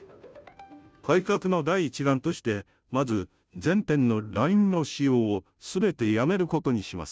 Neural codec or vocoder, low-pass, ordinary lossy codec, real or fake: codec, 16 kHz, 0.5 kbps, FunCodec, trained on Chinese and English, 25 frames a second; none; none; fake